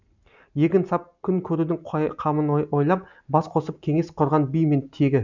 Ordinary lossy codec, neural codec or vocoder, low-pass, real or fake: none; none; 7.2 kHz; real